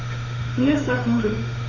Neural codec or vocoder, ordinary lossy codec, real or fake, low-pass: autoencoder, 48 kHz, 32 numbers a frame, DAC-VAE, trained on Japanese speech; none; fake; 7.2 kHz